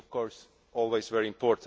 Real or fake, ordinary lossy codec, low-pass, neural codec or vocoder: real; none; none; none